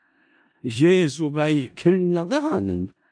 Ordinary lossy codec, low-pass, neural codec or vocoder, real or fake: AAC, 64 kbps; 9.9 kHz; codec, 16 kHz in and 24 kHz out, 0.4 kbps, LongCat-Audio-Codec, four codebook decoder; fake